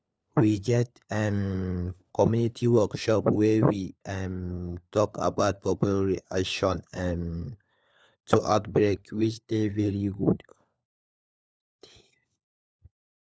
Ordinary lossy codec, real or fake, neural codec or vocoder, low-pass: none; fake; codec, 16 kHz, 4 kbps, FunCodec, trained on LibriTTS, 50 frames a second; none